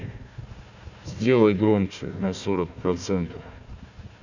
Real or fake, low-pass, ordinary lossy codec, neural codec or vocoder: fake; 7.2 kHz; none; codec, 16 kHz, 1 kbps, FunCodec, trained on Chinese and English, 50 frames a second